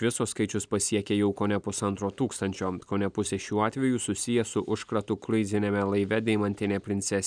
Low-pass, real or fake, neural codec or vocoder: 9.9 kHz; real; none